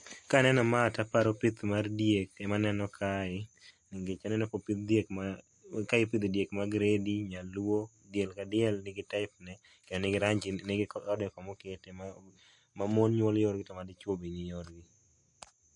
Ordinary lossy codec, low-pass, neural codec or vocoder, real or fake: MP3, 48 kbps; 10.8 kHz; none; real